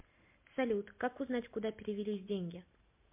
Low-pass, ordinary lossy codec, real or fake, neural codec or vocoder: 3.6 kHz; MP3, 32 kbps; real; none